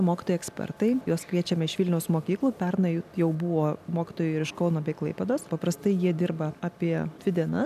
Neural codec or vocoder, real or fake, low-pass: none; real; 14.4 kHz